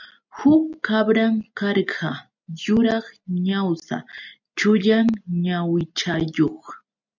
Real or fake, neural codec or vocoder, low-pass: real; none; 7.2 kHz